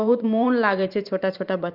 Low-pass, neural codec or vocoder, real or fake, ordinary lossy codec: 5.4 kHz; vocoder, 44.1 kHz, 128 mel bands every 512 samples, BigVGAN v2; fake; Opus, 32 kbps